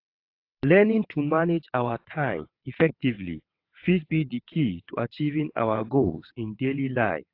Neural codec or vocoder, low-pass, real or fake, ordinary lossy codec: vocoder, 22.05 kHz, 80 mel bands, WaveNeXt; 5.4 kHz; fake; AAC, 32 kbps